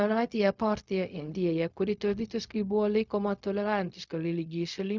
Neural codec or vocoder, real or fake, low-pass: codec, 16 kHz, 0.4 kbps, LongCat-Audio-Codec; fake; 7.2 kHz